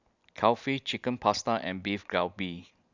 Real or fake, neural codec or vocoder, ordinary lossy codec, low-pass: real; none; none; 7.2 kHz